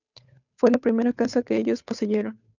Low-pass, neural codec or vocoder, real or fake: 7.2 kHz; codec, 16 kHz, 8 kbps, FunCodec, trained on Chinese and English, 25 frames a second; fake